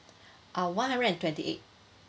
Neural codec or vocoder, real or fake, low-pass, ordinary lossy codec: none; real; none; none